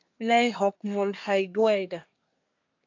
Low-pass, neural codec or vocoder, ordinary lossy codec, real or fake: 7.2 kHz; codec, 24 kHz, 1 kbps, SNAC; AAC, 48 kbps; fake